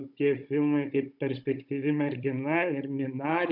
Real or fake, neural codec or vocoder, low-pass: fake; codec, 16 kHz, 16 kbps, FunCodec, trained on Chinese and English, 50 frames a second; 5.4 kHz